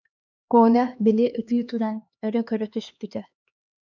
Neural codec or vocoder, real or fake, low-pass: codec, 16 kHz, 2 kbps, X-Codec, HuBERT features, trained on LibriSpeech; fake; 7.2 kHz